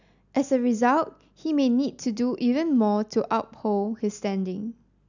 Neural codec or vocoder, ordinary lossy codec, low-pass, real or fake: none; none; 7.2 kHz; real